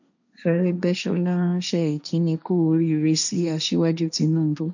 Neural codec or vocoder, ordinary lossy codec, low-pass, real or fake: codec, 16 kHz, 1.1 kbps, Voila-Tokenizer; none; 7.2 kHz; fake